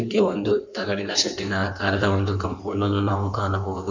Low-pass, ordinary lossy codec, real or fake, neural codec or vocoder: 7.2 kHz; none; fake; codec, 16 kHz in and 24 kHz out, 1.1 kbps, FireRedTTS-2 codec